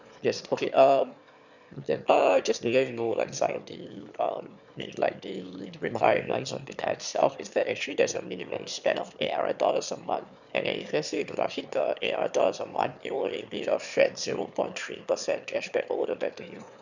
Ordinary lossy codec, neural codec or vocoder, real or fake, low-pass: none; autoencoder, 22.05 kHz, a latent of 192 numbers a frame, VITS, trained on one speaker; fake; 7.2 kHz